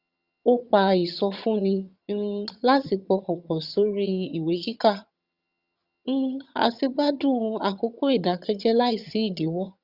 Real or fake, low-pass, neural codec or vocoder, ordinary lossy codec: fake; 5.4 kHz; vocoder, 22.05 kHz, 80 mel bands, HiFi-GAN; Opus, 64 kbps